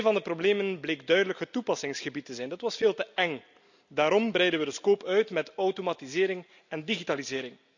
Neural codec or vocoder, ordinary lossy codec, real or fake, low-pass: none; none; real; 7.2 kHz